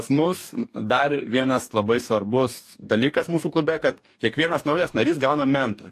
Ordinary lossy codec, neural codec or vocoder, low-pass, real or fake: AAC, 64 kbps; codec, 44.1 kHz, 2.6 kbps, DAC; 14.4 kHz; fake